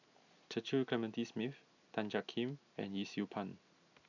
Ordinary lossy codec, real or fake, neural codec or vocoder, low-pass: none; real; none; 7.2 kHz